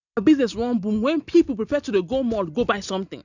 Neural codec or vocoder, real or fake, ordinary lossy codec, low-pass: none; real; none; 7.2 kHz